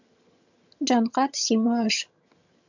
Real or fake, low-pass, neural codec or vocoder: fake; 7.2 kHz; vocoder, 22.05 kHz, 80 mel bands, HiFi-GAN